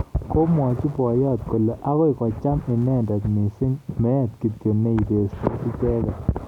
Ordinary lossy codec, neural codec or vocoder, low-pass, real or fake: none; none; 19.8 kHz; real